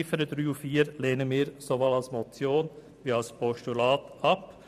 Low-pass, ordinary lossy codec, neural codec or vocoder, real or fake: 14.4 kHz; none; vocoder, 44.1 kHz, 128 mel bands every 512 samples, BigVGAN v2; fake